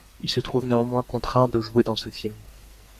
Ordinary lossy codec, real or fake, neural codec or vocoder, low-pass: Opus, 64 kbps; fake; codec, 44.1 kHz, 2.6 kbps, SNAC; 14.4 kHz